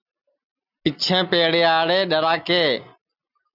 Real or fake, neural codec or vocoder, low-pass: real; none; 5.4 kHz